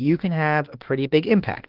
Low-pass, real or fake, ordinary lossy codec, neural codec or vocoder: 5.4 kHz; fake; Opus, 16 kbps; autoencoder, 48 kHz, 32 numbers a frame, DAC-VAE, trained on Japanese speech